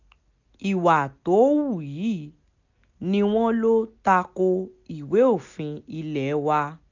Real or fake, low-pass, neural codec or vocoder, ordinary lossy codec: real; 7.2 kHz; none; none